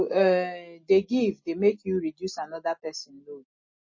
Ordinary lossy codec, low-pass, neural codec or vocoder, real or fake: MP3, 32 kbps; 7.2 kHz; none; real